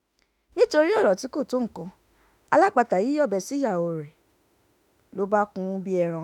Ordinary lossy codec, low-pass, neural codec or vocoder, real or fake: none; 19.8 kHz; autoencoder, 48 kHz, 32 numbers a frame, DAC-VAE, trained on Japanese speech; fake